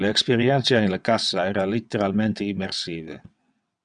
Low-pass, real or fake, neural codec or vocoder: 9.9 kHz; fake; vocoder, 22.05 kHz, 80 mel bands, WaveNeXt